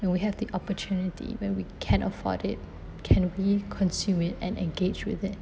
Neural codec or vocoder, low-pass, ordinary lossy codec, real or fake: none; none; none; real